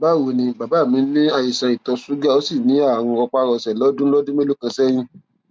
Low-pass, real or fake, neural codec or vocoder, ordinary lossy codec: none; real; none; none